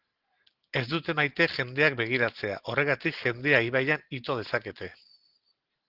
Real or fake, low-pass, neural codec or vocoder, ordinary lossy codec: real; 5.4 kHz; none; Opus, 16 kbps